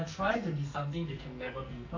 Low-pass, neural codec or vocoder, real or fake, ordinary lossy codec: 7.2 kHz; codec, 44.1 kHz, 2.6 kbps, SNAC; fake; AAC, 48 kbps